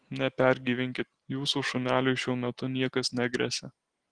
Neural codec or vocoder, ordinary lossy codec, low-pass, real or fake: none; Opus, 16 kbps; 9.9 kHz; real